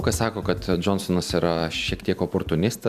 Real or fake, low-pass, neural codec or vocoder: real; 14.4 kHz; none